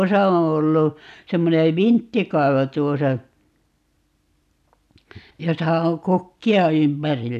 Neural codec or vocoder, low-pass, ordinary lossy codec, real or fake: none; 14.4 kHz; none; real